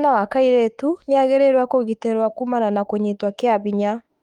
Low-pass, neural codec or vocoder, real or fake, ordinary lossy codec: 19.8 kHz; autoencoder, 48 kHz, 32 numbers a frame, DAC-VAE, trained on Japanese speech; fake; Opus, 32 kbps